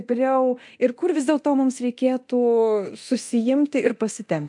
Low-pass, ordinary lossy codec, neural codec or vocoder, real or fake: 10.8 kHz; MP3, 64 kbps; codec, 24 kHz, 0.9 kbps, DualCodec; fake